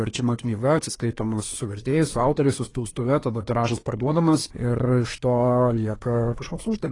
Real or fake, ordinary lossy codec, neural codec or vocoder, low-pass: fake; AAC, 32 kbps; codec, 24 kHz, 1 kbps, SNAC; 10.8 kHz